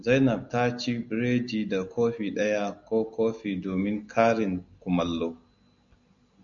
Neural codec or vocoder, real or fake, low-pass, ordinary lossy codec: none; real; 7.2 kHz; MP3, 64 kbps